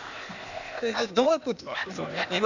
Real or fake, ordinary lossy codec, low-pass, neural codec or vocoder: fake; none; 7.2 kHz; codec, 16 kHz, 0.8 kbps, ZipCodec